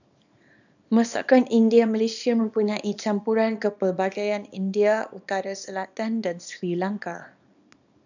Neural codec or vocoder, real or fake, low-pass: codec, 24 kHz, 0.9 kbps, WavTokenizer, small release; fake; 7.2 kHz